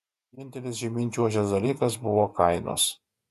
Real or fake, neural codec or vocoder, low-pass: fake; vocoder, 48 kHz, 128 mel bands, Vocos; 14.4 kHz